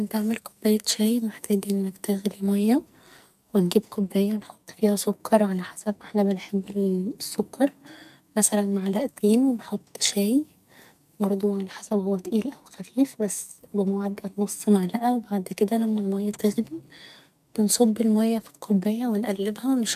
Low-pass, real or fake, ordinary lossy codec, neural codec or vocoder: 14.4 kHz; fake; none; codec, 44.1 kHz, 2.6 kbps, SNAC